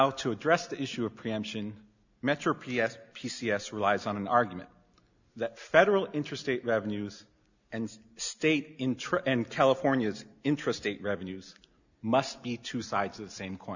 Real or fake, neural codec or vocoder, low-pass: real; none; 7.2 kHz